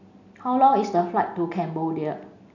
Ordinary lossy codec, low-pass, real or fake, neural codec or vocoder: none; 7.2 kHz; real; none